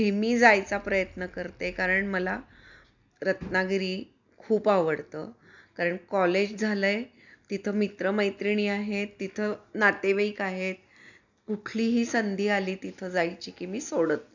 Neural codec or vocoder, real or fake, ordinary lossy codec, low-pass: none; real; none; 7.2 kHz